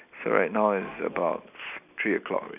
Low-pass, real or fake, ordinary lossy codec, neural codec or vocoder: 3.6 kHz; real; none; none